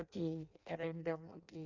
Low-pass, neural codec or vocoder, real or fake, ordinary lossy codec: 7.2 kHz; codec, 16 kHz in and 24 kHz out, 0.6 kbps, FireRedTTS-2 codec; fake; none